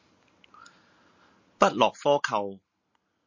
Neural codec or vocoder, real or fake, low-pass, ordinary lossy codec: none; real; 7.2 kHz; MP3, 32 kbps